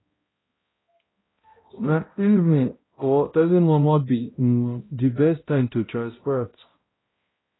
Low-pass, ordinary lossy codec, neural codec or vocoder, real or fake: 7.2 kHz; AAC, 16 kbps; codec, 16 kHz, 0.5 kbps, X-Codec, HuBERT features, trained on balanced general audio; fake